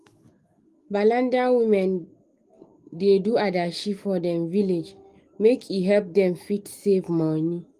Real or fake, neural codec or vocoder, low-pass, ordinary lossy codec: fake; autoencoder, 48 kHz, 128 numbers a frame, DAC-VAE, trained on Japanese speech; 14.4 kHz; Opus, 24 kbps